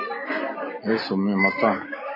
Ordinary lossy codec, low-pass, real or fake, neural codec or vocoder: MP3, 24 kbps; 5.4 kHz; real; none